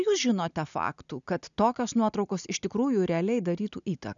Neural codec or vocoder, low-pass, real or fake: none; 7.2 kHz; real